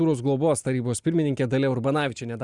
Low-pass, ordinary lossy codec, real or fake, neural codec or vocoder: 10.8 kHz; Opus, 64 kbps; real; none